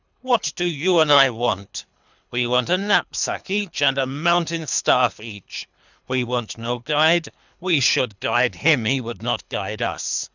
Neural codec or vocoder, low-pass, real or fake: codec, 24 kHz, 3 kbps, HILCodec; 7.2 kHz; fake